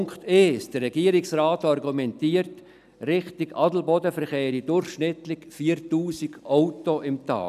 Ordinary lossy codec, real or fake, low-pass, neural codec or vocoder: none; real; 14.4 kHz; none